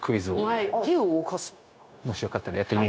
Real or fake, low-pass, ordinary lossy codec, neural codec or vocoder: fake; none; none; codec, 16 kHz, 0.9 kbps, LongCat-Audio-Codec